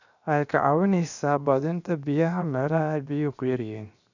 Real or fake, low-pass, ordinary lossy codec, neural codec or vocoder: fake; 7.2 kHz; none; codec, 16 kHz, 0.7 kbps, FocalCodec